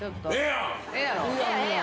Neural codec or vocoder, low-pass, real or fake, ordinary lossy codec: none; none; real; none